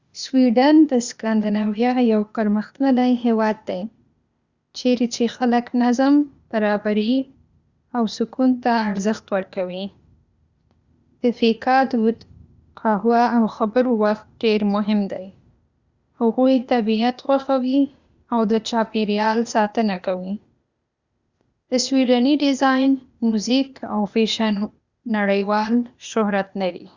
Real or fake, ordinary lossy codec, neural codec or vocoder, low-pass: fake; Opus, 64 kbps; codec, 16 kHz, 0.8 kbps, ZipCodec; 7.2 kHz